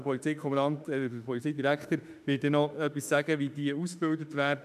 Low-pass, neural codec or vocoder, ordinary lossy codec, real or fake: 14.4 kHz; autoencoder, 48 kHz, 32 numbers a frame, DAC-VAE, trained on Japanese speech; none; fake